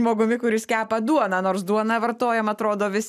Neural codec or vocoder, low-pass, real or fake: none; 14.4 kHz; real